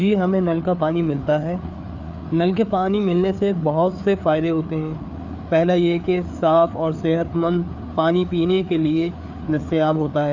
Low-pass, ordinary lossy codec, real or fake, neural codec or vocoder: 7.2 kHz; none; fake; codec, 16 kHz, 4 kbps, FreqCodec, larger model